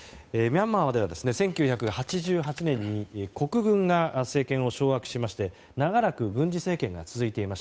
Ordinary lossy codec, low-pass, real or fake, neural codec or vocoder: none; none; fake; codec, 16 kHz, 8 kbps, FunCodec, trained on Chinese and English, 25 frames a second